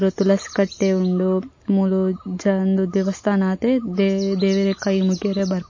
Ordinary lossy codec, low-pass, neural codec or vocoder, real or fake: MP3, 32 kbps; 7.2 kHz; none; real